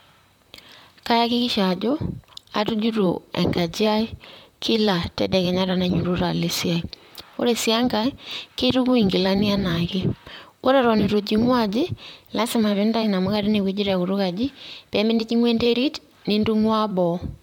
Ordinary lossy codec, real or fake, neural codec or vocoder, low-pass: MP3, 96 kbps; fake; vocoder, 44.1 kHz, 128 mel bands, Pupu-Vocoder; 19.8 kHz